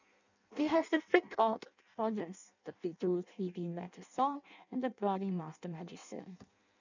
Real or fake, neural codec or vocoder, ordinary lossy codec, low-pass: fake; codec, 16 kHz in and 24 kHz out, 0.6 kbps, FireRedTTS-2 codec; none; 7.2 kHz